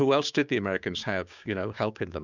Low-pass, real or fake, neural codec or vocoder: 7.2 kHz; fake; codec, 16 kHz, 6 kbps, DAC